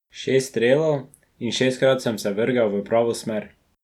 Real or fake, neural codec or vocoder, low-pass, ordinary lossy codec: real; none; 19.8 kHz; none